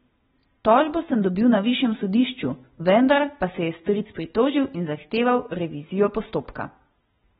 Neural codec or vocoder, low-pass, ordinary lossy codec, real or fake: none; 19.8 kHz; AAC, 16 kbps; real